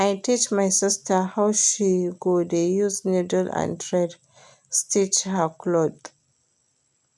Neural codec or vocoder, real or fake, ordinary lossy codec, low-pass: none; real; none; none